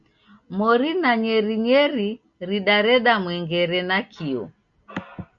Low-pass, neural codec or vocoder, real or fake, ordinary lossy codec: 7.2 kHz; none; real; Opus, 64 kbps